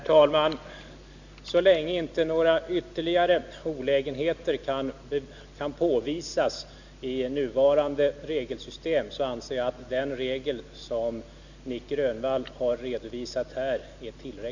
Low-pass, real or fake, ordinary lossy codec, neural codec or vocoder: 7.2 kHz; real; none; none